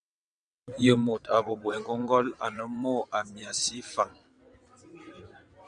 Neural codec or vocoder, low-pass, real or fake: vocoder, 22.05 kHz, 80 mel bands, WaveNeXt; 9.9 kHz; fake